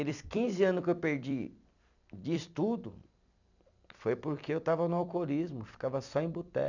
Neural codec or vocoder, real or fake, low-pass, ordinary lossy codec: vocoder, 44.1 kHz, 128 mel bands every 256 samples, BigVGAN v2; fake; 7.2 kHz; none